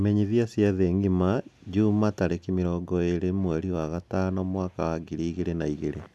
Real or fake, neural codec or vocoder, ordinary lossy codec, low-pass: real; none; none; none